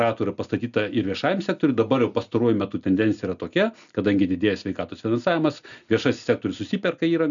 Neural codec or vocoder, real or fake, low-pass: none; real; 7.2 kHz